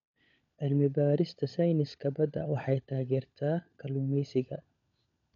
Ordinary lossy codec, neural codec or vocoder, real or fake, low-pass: none; codec, 16 kHz, 16 kbps, FunCodec, trained on LibriTTS, 50 frames a second; fake; 7.2 kHz